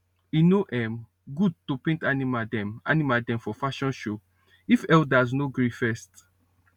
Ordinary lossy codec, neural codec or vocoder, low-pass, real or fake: none; none; 19.8 kHz; real